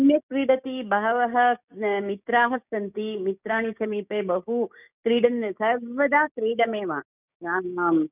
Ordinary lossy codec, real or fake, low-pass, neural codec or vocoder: none; fake; 3.6 kHz; codec, 16 kHz, 6 kbps, DAC